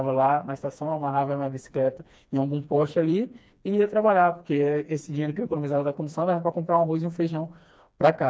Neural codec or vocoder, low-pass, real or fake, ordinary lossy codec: codec, 16 kHz, 2 kbps, FreqCodec, smaller model; none; fake; none